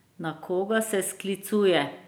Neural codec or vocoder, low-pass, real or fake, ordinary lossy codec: none; none; real; none